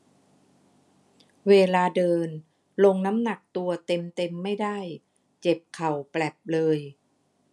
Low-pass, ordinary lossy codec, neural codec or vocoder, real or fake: none; none; none; real